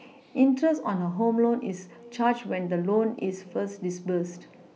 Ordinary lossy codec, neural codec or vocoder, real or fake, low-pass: none; none; real; none